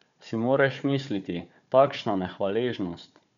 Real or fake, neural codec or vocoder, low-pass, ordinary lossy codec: fake; codec, 16 kHz, 4 kbps, FunCodec, trained on Chinese and English, 50 frames a second; 7.2 kHz; none